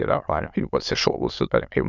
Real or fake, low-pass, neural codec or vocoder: fake; 7.2 kHz; autoencoder, 22.05 kHz, a latent of 192 numbers a frame, VITS, trained on many speakers